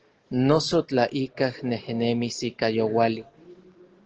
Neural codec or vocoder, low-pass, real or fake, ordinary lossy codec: none; 7.2 kHz; real; Opus, 16 kbps